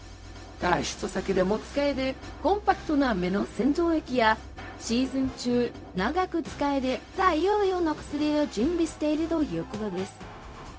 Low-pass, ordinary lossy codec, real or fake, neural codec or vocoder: none; none; fake; codec, 16 kHz, 0.4 kbps, LongCat-Audio-Codec